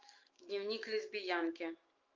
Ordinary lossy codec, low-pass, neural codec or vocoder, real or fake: Opus, 32 kbps; 7.2 kHz; autoencoder, 48 kHz, 128 numbers a frame, DAC-VAE, trained on Japanese speech; fake